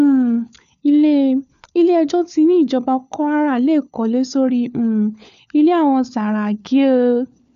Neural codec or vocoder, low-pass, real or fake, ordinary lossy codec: codec, 16 kHz, 4 kbps, FunCodec, trained on LibriTTS, 50 frames a second; 7.2 kHz; fake; none